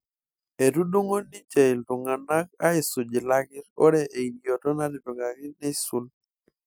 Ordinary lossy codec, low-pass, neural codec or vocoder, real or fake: none; none; none; real